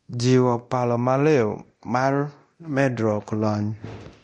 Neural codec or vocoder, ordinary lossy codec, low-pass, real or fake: codec, 24 kHz, 0.9 kbps, DualCodec; MP3, 48 kbps; 10.8 kHz; fake